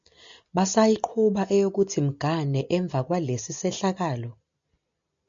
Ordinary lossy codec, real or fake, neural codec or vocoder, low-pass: MP3, 64 kbps; real; none; 7.2 kHz